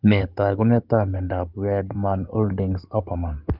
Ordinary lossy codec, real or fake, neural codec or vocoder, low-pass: Opus, 32 kbps; fake; codec, 16 kHz in and 24 kHz out, 2.2 kbps, FireRedTTS-2 codec; 5.4 kHz